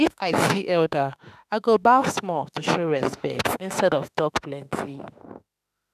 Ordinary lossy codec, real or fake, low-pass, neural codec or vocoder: none; fake; 14.4 kHz; autoencoder, 48 kHz, 32 numbers a frame, DAC-VAE, trained on Japanese speech